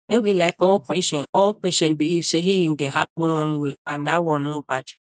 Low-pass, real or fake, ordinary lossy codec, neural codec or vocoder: 10.8 kHz; fake; none; codec, 24 kHz, 0.9 kbps, WavTokenizer, medium music audio release